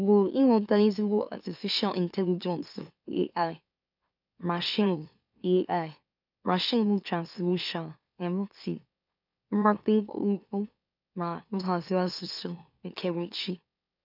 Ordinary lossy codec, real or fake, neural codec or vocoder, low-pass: none; fake; autoencoder, 44.1 kHz, a latent of 192 numbers a frame, MeloTTS; 5.4 kHz